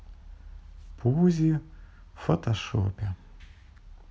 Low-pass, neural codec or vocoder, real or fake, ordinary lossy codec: none; none; real; none